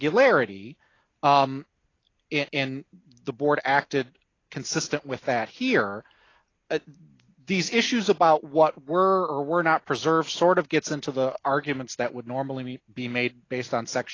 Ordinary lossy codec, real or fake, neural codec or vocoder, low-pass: AAC, 32 kbps; real; none; 7.2 kHz